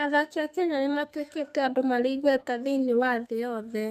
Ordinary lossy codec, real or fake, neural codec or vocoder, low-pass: none; fake; codec, 32 kHz, 1.9 kbps, SNAC; 14.4 kHz